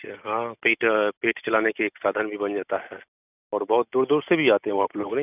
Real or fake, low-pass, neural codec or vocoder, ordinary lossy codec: real; 3.6 kHz; none; none